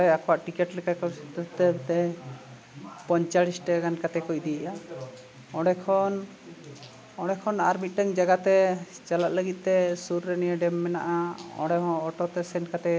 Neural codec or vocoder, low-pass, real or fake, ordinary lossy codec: none; none; real; none